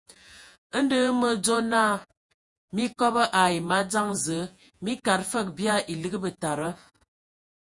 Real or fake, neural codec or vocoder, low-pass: fake; vocoder, 48 kHz, 128 mel bands, Vocos; 10.8 kHz